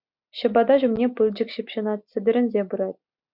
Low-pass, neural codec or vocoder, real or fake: 5.4 kHz; none; real